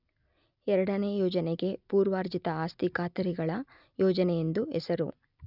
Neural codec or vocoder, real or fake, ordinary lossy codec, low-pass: none; real; none; 5.4 kHz